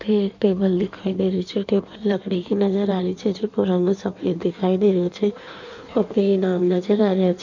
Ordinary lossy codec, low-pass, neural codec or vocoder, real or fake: none; 7.2 kHz; codec, 16 kHz in and 24 kHz out, 1.1 kbps, FireRedTTS-2 codec; fake